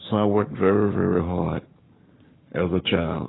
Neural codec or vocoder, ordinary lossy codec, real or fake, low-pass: codec, 44.1 kHz, 7.8 kbps, Pupu-Codec; AAC, 16 kbps; fake; 7.2 kHz